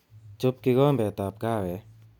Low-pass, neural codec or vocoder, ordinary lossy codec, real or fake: 19.8 kHz; none; none; real